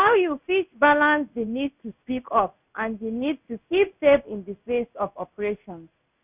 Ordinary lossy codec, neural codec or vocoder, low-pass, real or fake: none; codec, 16 kHz in and 24 kHz out, 1 kbps, XY-Tokenizer; 3.6 kHz; fake